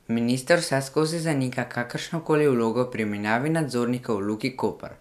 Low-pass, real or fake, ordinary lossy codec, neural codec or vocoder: 14.4 kHz; real; none; none